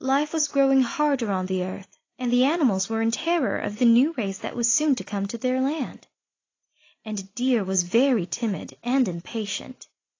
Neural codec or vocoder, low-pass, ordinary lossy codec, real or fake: none; 7.2 kHz; AAC, 32 kbps; real